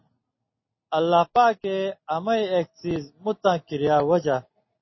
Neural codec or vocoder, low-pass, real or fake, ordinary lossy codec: none; 7.2 kHz; real; MP3, 24 kbps